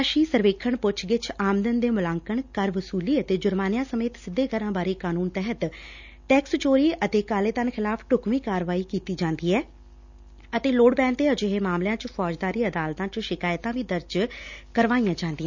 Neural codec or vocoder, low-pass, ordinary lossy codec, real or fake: none; 7.2 kHz; none; real